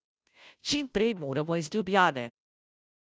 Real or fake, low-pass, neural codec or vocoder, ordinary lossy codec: fake; none; codec, 16 kHz, 0.5 kbps, FunCodec, trained on Chinese and English, 25 frames a second; none